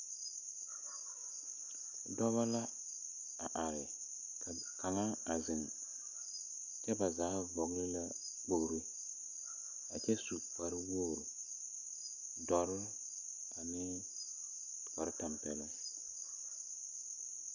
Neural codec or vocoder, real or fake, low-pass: none; real; 7.2 kHz